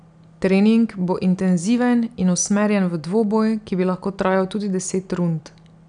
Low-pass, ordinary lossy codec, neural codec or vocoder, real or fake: 9.9 kHz; none; none; real